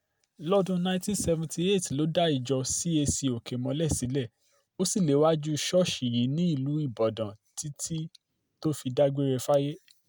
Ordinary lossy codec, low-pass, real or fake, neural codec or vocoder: none; none; real; none